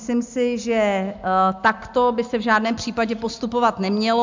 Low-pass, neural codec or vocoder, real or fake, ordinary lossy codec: 7.2 kHz; none; real; MP3, 64 kbps